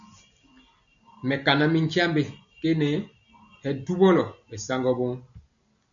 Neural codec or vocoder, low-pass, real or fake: none; 7.2 kHz; real